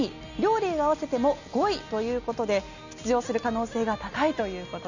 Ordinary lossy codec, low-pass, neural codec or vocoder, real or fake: none; 7.2 kHz; none; real